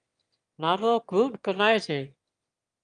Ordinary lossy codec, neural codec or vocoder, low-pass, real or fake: Opus, 32 kbps; autoencoder, 22.05 kHz, a latent of 192 numbers a frame, VITS, trained on one speaker; 9.9 kHz; fake